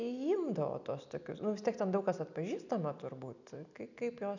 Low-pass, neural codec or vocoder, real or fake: 7.2 kHz; none; real